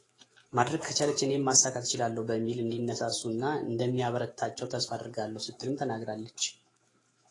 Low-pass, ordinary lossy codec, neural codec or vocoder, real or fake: 10.8 kHz; AAC, 32 kbps; autoencoder, 48 kHz, 128 numbers a frame, DAC-VAE, trained on Japanese speech; fake